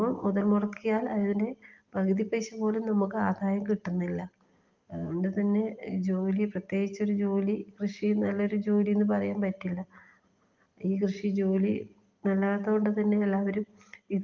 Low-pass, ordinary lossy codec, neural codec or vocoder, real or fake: 7.2 kHz; Opus, 32 kbps; none; real